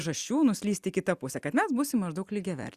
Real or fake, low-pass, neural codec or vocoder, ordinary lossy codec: real; 14.4 kHz; none; Opus, 64 kbps